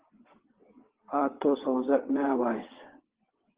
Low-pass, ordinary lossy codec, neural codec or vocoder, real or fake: 3.6 kHz; Opus, 16 kbps; vocoder, 22.05 kHz, 80 mel bands, WaveNeXt; fake